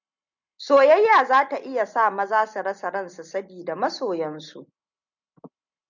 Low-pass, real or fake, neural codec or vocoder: 7.2 kHz; real; none